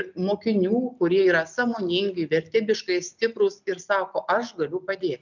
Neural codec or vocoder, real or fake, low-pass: none; real; 7.2 kHz